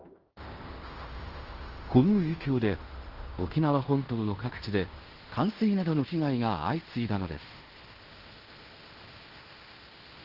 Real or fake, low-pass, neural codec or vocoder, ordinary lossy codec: fake; 5.4 kHz; codec, 16 kHz in and 24 kHz out, 0.9 kbps, LongCat-Audio-Codec, fine tuned four codebook decoder; Opus, 16 kbps